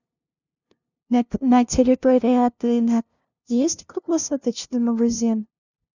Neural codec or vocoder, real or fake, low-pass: codec, 16 kHz, 0.5 kbps, FunCodec, trained on LibriTTS, 25 frames a second; fake; 7.2 kHz